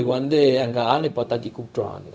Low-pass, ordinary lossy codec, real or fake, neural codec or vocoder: none; none; fake; codec, 16 kHz, 0.4 kbps, LongCat-Audio-Codec